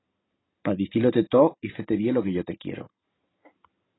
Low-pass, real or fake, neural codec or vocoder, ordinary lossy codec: 7.2 kHz; real; none; AAC, 16 kbps